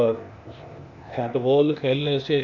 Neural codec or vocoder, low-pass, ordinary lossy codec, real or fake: codec, 16 kHz, 0.8 kbps, ZipCodec; 7.2 kHz; none; fake